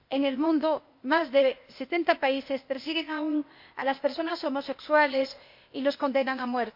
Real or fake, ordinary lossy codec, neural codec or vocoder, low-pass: fake; MP3, 32 kbps; codec, 16 kHz, 0.8 kbps, ZipCodec; 5.4 kHz